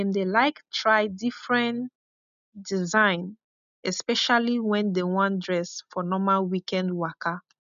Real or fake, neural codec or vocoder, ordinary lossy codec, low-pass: real; none; none; 7.2 kHz